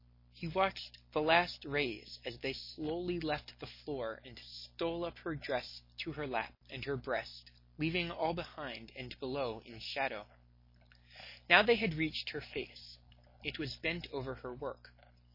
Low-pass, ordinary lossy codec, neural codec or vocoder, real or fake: 5.4 kHz; MP3, 24 kbps; none; real